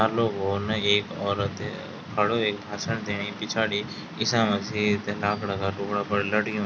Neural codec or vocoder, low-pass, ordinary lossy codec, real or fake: none; none; none; real